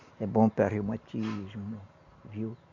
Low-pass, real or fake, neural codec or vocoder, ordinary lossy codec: 7.2 kHz; real; none; MP3, 48 kbps